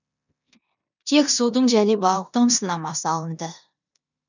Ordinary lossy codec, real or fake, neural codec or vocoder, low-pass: none; fake; codec, 16 kHz in and 24 kHz out, 0.9 kbps, LongCat-Audio-Codec, four codebook decoder; 7.2 kHz